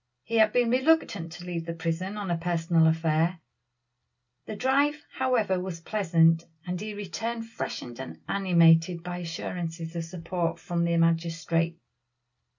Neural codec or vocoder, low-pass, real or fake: none; 7.2 kHz; real